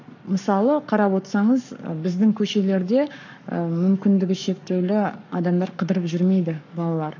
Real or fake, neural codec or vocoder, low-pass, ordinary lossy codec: fake; codec, 44.1 kHz, 7.8 kbps, Pupu-Codec; 7.2 kHz; none